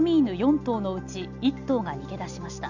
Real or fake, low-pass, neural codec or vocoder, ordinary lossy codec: real; 7.2 kHz; none; none